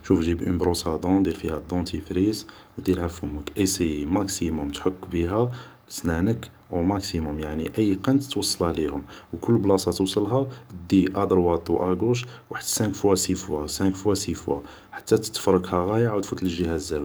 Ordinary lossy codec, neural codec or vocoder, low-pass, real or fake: none; codec, 44.1 kHz, 7.8 kbps, DAC; none; fake